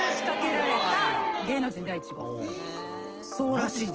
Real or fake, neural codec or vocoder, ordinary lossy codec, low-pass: real; none; Opus, 16 kbps; 7.2 kHz